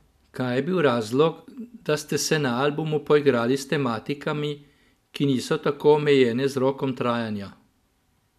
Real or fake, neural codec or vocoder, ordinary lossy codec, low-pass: real; none; MP3, 96 kbps; 14.4 kHz